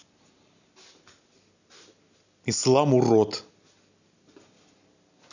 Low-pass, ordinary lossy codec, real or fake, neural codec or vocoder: 7.2 kHz; none; real; none